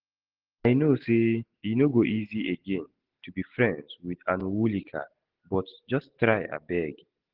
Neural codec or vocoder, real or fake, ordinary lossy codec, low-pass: none; real; Opus, 16 kbps; 5.4 kHz